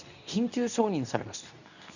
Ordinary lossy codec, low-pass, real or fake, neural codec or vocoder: none; 7.2 kHz; fake; codec, 24 kHz, 0.9 kbps, WavTokenizer, medium speech release version 2